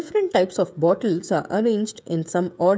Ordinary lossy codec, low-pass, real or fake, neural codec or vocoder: none; none; fake; codec, 16 kHz, 16 kbps, FreqCodec, smaller model